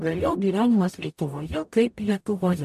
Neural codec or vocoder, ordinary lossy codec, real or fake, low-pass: codec, 44.1 kHz, 0.9 kbps, DAC; MP3, 64 kbps; fake; 14.4 kHz